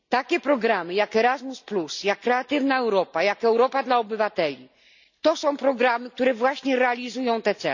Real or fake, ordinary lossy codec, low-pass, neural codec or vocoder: real; none; 7.2 kHz; none